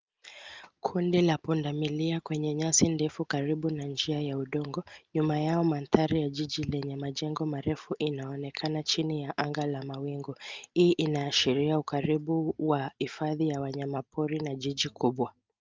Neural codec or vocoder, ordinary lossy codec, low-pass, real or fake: none; Opus, 24 kbps; 7.2 kHz; real